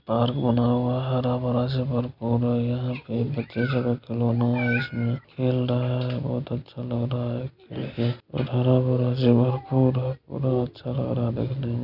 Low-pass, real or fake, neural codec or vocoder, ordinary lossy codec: 5.4 kHz; real; none; none